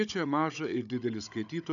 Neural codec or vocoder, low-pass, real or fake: codec, 16 kHz, 16 kbps, FunCodec, trained on Chinese and English, 50 frames a second; 7.2 kHz; fake